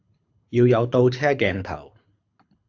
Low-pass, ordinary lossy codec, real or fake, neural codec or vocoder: 7.2 kHz; AAC, 48 kbps; fake; codec, 24 kHz, 6 kbps, HILCodec